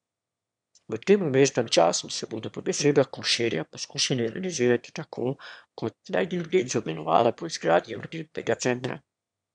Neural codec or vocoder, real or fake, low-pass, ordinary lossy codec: autoencoder, 22.05 kHz, a latent of 192 numbers a frame, VITS, trained on one speaker; fake; 9.9 kHz; none